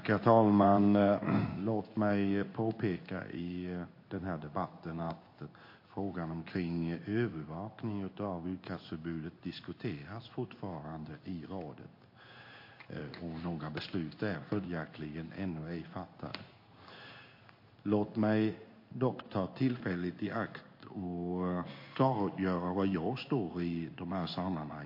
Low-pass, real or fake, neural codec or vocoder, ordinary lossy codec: 5.4 kHz; fake; codec, 16 kHz in and 24 kHz out, 1 kbps, XY-Tokenizer; MP3, 32 kbps